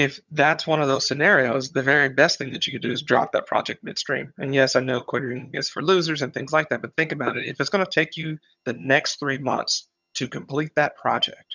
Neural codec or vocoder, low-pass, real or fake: vocoder, 22.05 kHz, 80 mel bands, HiFi-GAN; 7.2 kHz; fake